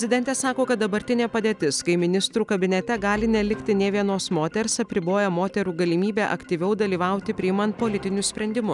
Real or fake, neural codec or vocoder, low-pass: real; none; 10.8 kHz